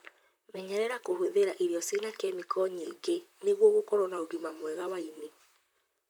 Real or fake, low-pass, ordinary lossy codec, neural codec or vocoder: fake; none; none; vocoder, 44.1 kHz, 128 mel bands, Pupu-Vocoder